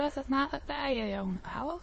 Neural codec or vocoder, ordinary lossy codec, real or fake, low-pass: autoencoder, 22.05 kHz, a latent of 192 numbers a frame, VITS, trained on many speakers; MP3, 32 kbps; fake; 9.9 kHz